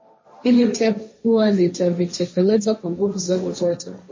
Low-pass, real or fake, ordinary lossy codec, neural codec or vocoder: 7.2 kHz; fake; MP3, 32 kbps; codec, 16 kHz, 1.1 kbps, Voila-Tokenizer